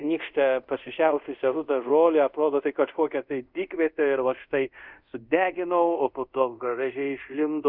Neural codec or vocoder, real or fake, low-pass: codec, 24 kHz, 0.5 kbps, DualCodec; fake; 5.4 kHz